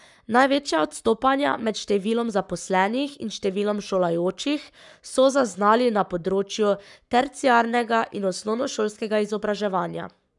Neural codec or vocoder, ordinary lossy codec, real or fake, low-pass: codec, 44.1 kHz, 7.8 kbps, Pupu-Codec; none; fake; 10.8 kHz